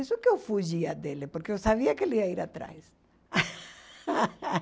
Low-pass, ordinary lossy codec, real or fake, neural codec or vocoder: none; none; real; none